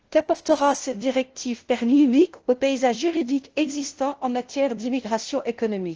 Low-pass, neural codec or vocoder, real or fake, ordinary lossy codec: 7.2 kHz; codec, 16 kHz, 0.5 kbps, FunCodec, trained on LibriTTS, 25 frames a second; fake; Opus, 16 kbps